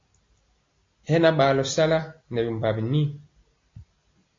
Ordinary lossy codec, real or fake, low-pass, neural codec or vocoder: AAC, 32 kbps; real; 7.2 kHz; none